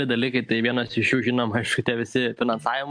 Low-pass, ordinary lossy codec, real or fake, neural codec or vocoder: 9.9 kHz; MP3, 64 kbps; real; none